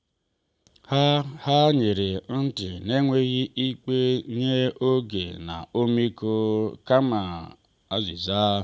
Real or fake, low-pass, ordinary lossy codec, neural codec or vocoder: real; none; none; none